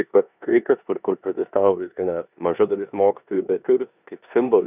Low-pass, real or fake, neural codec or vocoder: 3.6 kHz; fake; codec, 16 kHz in and 24 kHz out, 0.9 kbps, LongCat-Audio-Codec, four codebook decoder